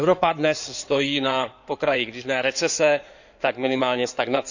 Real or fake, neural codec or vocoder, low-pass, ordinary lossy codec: fake; codec, 16 kHz in and 24 kHz out, 2.2 kbps, FireRedTTS-2 codec; 7.2 kHz; none